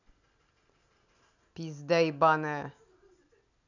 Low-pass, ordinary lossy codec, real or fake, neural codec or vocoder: 7.2 kHz; none; real; none